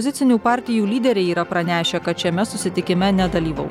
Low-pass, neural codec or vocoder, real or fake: 19.8 kHz; none; real